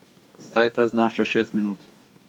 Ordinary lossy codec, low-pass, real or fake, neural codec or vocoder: none; 19.8 kHz; fake; codec, 44.1 kHz, 2.6 kbps, DAC